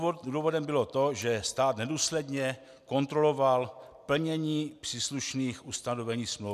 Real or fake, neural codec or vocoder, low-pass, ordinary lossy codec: real; none; 14.4 kHz; MP3, 96 kbps